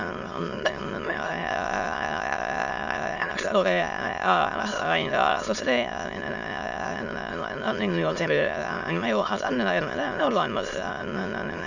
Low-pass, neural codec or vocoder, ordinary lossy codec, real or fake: 7.2 kHz; autoencoder, 22.05 kHz, a latent of 192 numbers a frame, VITS, trained on many speakers; none; fake